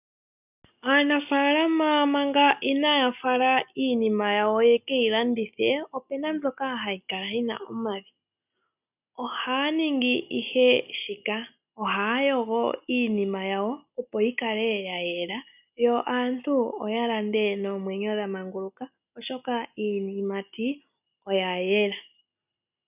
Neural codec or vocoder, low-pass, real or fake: none; 3.6 kHz; real